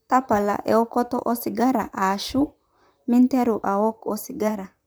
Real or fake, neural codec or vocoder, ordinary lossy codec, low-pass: fake; vocoder, 44.1 kHz, 128 mel bands, Pupu-Vocoder; none; none